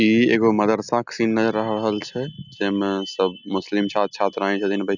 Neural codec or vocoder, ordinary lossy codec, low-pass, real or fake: none; none; 7.2 kHz; real